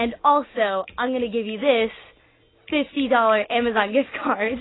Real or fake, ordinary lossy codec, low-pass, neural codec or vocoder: real; AAC, 16 kbps; 7.2 kHz; none